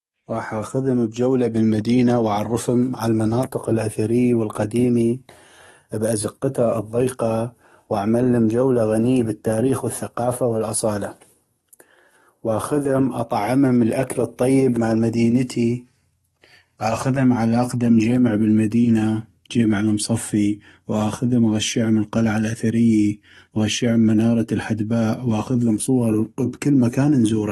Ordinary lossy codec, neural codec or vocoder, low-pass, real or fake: AAC, 32 kbps; codec, 44.1 kHz, 7.8 kbps, DAC; 19.8 kHz; fake